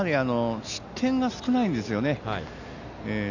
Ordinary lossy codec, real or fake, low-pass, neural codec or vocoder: none; real; 7.2 kHz; none